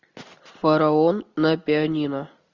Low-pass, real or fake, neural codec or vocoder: 7.2 kHz; real; none